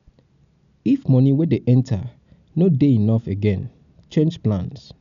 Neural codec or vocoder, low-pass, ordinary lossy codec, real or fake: none; 7.2 kHz; none; real